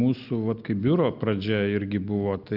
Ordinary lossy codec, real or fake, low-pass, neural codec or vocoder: Opus, 24 kbps; real; 5.4 kHz; none